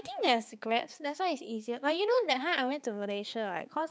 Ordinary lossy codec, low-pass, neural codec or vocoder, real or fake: none; none; codec, 16 kHz, 4 kbps, X-Codec, HuBERT features, trained on balanced general audio; fake